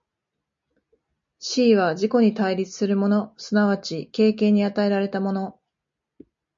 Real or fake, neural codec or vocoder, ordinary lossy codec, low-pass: real; none; MP3, 48 kbps; 7.2 kHz